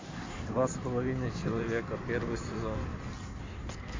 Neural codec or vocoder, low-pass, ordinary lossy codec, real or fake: codec, 16 kHz in and 24 kHz out, 2.2 kbps, FireRedTTS-2 codec; 7.2 kHz; MP3, 48 kbps; fake